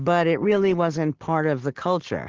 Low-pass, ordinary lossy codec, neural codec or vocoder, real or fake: 7.2 kHz; Opus, 16 kbps; none; real